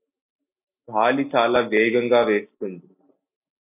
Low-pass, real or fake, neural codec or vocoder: 3.6 kHz; real; none